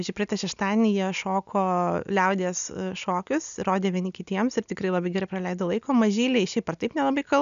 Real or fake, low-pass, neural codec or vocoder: real; 7.2 kHz; none